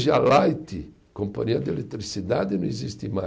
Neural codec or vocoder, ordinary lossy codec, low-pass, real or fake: none; none; none; real